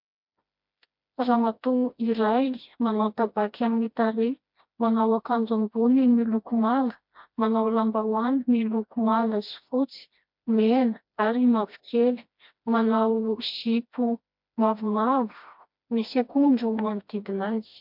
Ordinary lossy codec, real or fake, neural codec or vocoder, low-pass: MP3, 48 kbps; fake; codec, 16 kHz, 1 kbps, FreqCodec, smaller model; 5.4 kHz